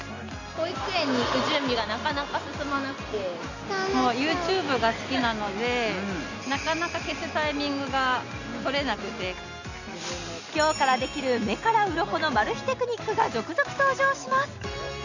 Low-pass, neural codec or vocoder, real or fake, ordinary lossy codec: 7.2 kHz; none; real; none